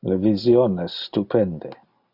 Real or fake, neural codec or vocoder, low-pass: real; none; 5.4 kHz